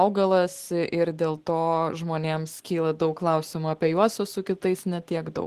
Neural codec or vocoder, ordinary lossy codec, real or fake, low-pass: none; Opus, 16 kbps; real; 14.4 kHz